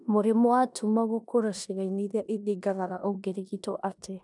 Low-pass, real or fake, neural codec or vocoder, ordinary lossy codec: 10.8 kHz; fake; codec, 16 kHz in and 24 kHz out, 0.9 kbps, LongCat-Audio-Codec, four codebook decoder; none